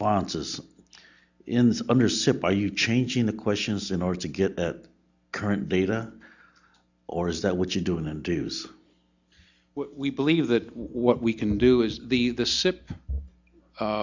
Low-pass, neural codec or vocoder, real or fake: 7.2 kHz; none; real